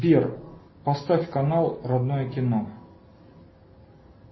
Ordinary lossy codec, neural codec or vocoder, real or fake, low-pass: MP3, 24 kbps; none; real; 7.2 kHz